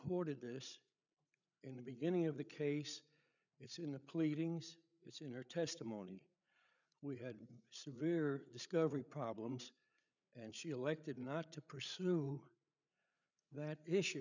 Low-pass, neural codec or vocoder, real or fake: 7.2 kHz; codec, 16 kHz, 8 kbps, FreqCodec, larger model; fake